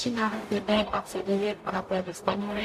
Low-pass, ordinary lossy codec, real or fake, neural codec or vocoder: 14.4 kHz; AAC, 64 kbps; fake; codec, 44.1 kHz, 0.9 kbps, DAC